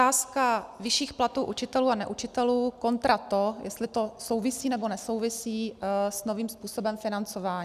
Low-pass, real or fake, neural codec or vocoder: 14.4 kHz; real; none